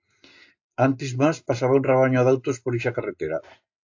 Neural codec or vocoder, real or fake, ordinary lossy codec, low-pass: none; real; AAC, 48 kbps; 7.2 kHz